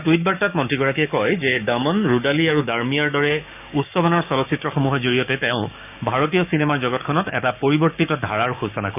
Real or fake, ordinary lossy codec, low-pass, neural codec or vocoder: fake; none; 3.6 kHz; codec, 16 kHz, 6 kbps, DAC